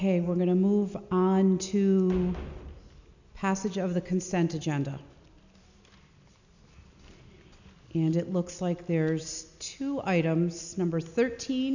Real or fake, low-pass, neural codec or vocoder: real; 7.2 kHz; none